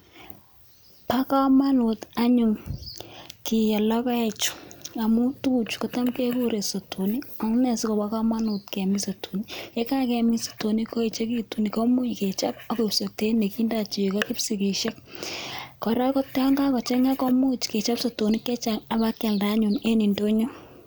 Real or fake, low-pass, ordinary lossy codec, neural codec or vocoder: real; none; none; none